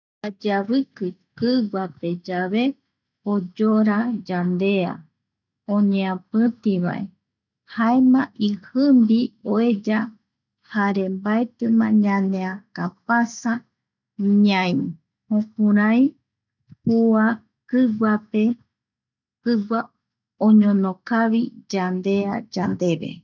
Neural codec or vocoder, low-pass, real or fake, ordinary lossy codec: none; 7.2 kHz; real; none